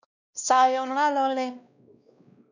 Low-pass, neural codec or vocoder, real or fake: 7.2 kHz; codec, 16 kHz, 1 kbps, X-Codec, WavLM features, trained on Multilingual LibriSpeech; fake